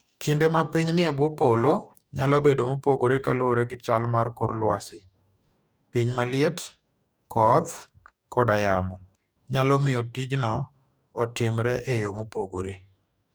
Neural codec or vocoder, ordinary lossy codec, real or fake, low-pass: codec, 44.1 kHz, 2.6 kbps, DAC; none; fake; none